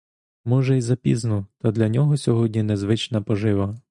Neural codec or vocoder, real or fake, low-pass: none; real; 10.8 kHz